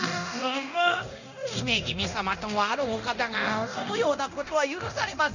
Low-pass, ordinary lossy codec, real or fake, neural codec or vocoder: 7.2 kHz; none; fake; codec, 24 kHz, 0.9 kbps, DualCodec